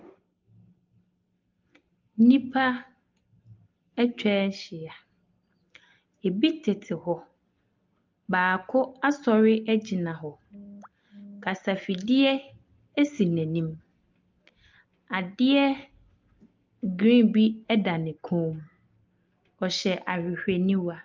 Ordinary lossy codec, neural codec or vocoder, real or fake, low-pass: Opus, 32 kbps; none; real; 7.2 kHz